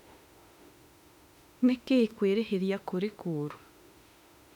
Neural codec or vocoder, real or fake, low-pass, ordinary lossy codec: autoencoder, 48 kHz, 32 numbers a frame, DAC-VAE, trained on Japanese speech; fake; 19.8 kHz; none